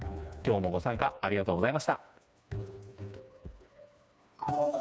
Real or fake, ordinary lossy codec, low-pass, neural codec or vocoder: fake; none; none; codec, 16 kHz, 2 kbps, FreqCodec, smaller model